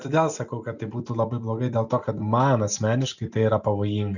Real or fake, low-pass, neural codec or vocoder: real; 7.2 kHz; none